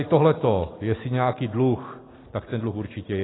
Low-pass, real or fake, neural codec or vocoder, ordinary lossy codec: 7.2 kHz; real; none; AAC, 16 kbps